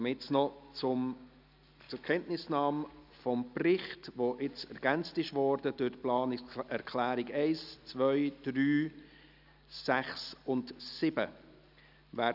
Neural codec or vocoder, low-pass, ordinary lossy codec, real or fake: none; 5.4 kHz; none; real